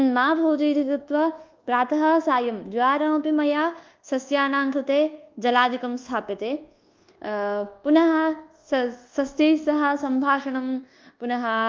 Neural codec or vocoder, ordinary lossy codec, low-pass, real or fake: codec, 24 kHz, 1.2 kbps, DualCodec; Opus, 32 kbps; 7.2 kHz; fake